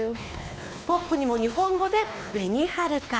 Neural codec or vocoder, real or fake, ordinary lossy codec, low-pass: codec, 16 kHz, 2 kbps, X-Codec, WavLM features, trained on Multilingual LibriSpeech; fake; none; none